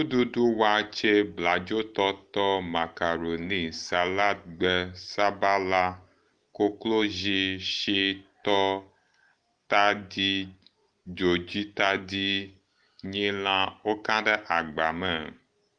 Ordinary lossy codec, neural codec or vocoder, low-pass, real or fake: Opus, 24 kbps; none; 9.9 kHz; real